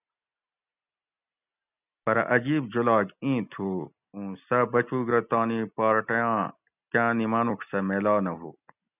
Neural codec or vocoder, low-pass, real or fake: none; 3.6 kHz; real